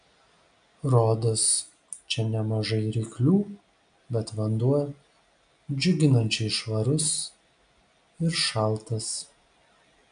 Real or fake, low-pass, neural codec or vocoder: real; 9.9 kHz; none